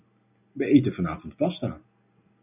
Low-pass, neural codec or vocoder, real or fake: 3.6 kHz; none; real